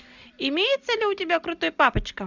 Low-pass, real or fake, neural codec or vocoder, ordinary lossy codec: 7.2 kHz; real; none; Opus, 64 kbps